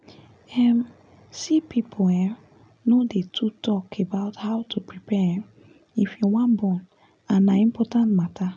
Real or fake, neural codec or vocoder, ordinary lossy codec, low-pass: real; none; none; 9.9 kHz